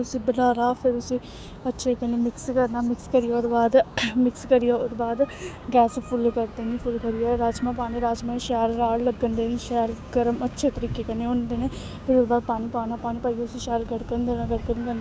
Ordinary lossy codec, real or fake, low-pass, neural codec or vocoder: none; fake; none; codec, 16 kHz, 6 kbps, DAC